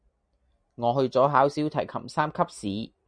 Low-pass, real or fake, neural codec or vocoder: 9.9 kHz; real; none